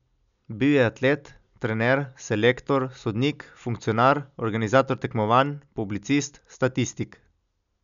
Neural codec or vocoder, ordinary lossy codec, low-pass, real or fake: none; none; 7.2 kHz; real